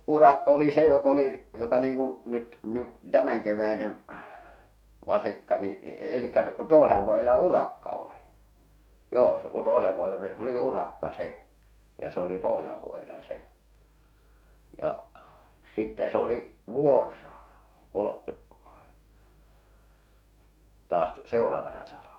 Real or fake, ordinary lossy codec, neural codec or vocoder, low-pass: fake; none; codec, 44.1 kHz, 2.6 kbps, DAC; 19.8 kHz